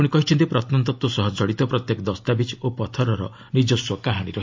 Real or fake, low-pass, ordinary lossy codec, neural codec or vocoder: real; 7.2 kHz; MP3, 48 kbps; none